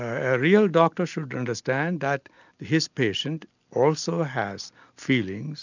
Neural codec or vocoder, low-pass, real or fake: none; 7.2 kHz; real